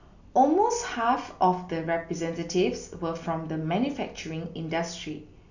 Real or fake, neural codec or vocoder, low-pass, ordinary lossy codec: real; none; 7.2 kHz; none